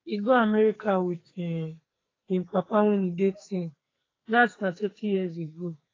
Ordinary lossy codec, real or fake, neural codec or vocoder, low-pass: AAC, 32 kbps; fake; codec, 44.1 kHz, 2.6 kbps, SNAC; 7.2 kHz